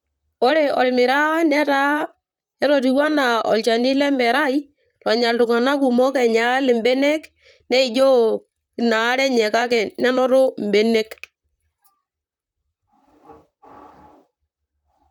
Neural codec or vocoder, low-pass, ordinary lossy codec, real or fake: vocoder, 44.1 kHz, 128 mel bands, Pupu-Vocoder; 19.8 kHz; none; fake